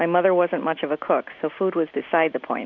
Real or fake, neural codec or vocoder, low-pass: real; none; 7.2 kHz